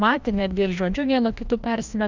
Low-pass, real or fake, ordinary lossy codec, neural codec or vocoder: 7.2 kHz; fake; Opus, 64 kbps; codec, 16 kHz, 1 kbps, FreqCodec, larger model